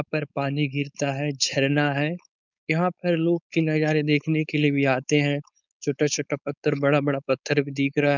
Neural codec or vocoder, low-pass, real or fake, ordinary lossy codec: codec, 16 kHz, 4.8 kbps, FACodec; 7.2 kHz; fake; none